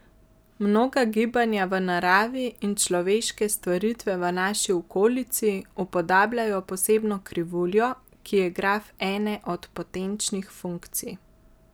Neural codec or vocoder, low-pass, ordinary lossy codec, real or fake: none; none; none; real